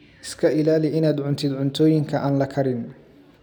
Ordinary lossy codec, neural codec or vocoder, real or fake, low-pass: none; none; real; none